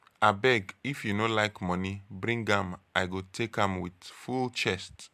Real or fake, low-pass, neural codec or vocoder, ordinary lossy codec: real; 14.4 kHz; none; MP3, 96 kbps